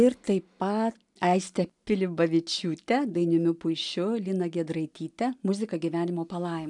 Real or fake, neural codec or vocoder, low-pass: real; none; 10.8 kHz